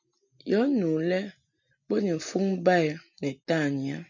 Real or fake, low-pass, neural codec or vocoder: real; 7.2 kHz; none